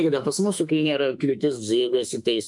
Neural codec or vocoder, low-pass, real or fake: autoencoder, 48 kHz, 32 numbers a frame, DAC-VAE, trained on Japanese speech; 10.8 kHz; fake